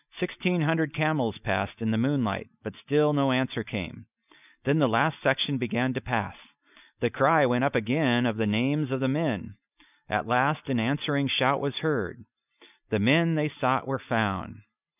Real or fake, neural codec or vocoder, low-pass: real; none; 3.6 kHz